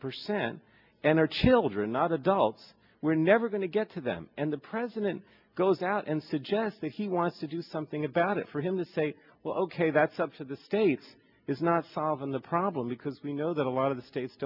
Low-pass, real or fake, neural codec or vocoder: 5.4 kHz; real; none